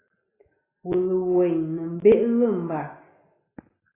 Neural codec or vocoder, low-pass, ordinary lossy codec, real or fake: none; 3.6 kHz; AAC, 16 kbps; real